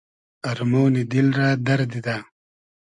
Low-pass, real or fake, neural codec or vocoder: 10.8 kHz; real; none